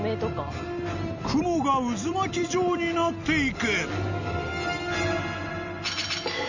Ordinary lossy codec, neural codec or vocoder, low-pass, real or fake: none; none; 7.2 kHz; real